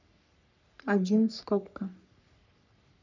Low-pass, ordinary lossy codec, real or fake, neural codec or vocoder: 7.2 kHz; none; fake; codec, 44.1 kHz, 3.4 kbps, Pupu-Codec